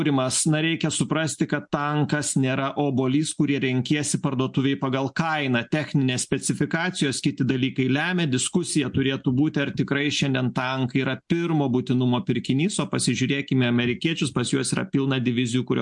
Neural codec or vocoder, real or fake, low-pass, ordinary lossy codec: none; real; 10.8 kHz; MP3, 64 kbps